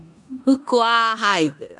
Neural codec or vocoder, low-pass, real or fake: codec, 16 kHz in and 24 kHz out, 0.9 kbps, LongCat-Audio-Codec, four codebook decoder; 10.8 kHz; fake